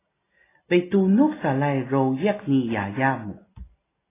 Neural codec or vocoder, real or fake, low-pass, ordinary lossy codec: none; real; 3.6 kHz; AAC, 16 kbps